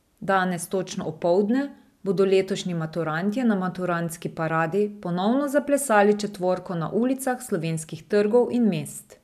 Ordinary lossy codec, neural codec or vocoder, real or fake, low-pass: none; none; real; 14.4 kHz